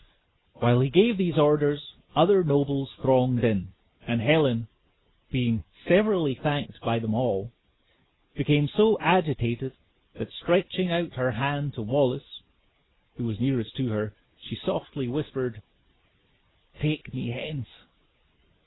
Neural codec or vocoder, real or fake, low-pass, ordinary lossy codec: none; real; 7.2 kHz; AAC, 16 kbps